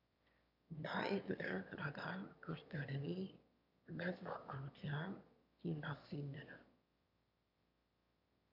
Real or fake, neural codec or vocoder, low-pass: fake; autoencoder, 22.05 kHz, a latent of 192 numbers a frame, VITS, trained on one speaker; 5.4 kHz